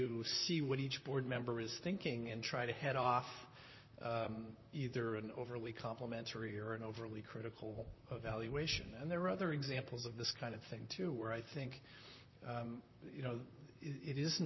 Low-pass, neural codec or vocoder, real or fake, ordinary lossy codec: 7.2 kHz; vocoder, 44.1 kHz, 128 mel bands, Pupu-Vocoder; fake; MP3, 24 kbps